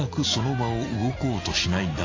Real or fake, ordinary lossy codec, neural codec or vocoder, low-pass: real; AAC, 32 kbps; none; 7.2 kHz